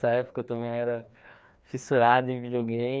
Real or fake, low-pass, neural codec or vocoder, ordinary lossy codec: fake; none; codec, 16 kHz, 2 kbps, FreqCodec, larger model; none